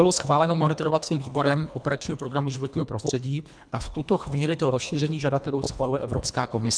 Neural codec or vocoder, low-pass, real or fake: codec, 24 kHz, 1.5 kbps, HILCodec; 9.9 kHz; fake